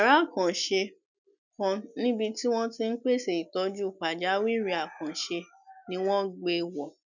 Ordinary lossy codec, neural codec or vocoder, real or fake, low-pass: none; none; real; 7.2 kHz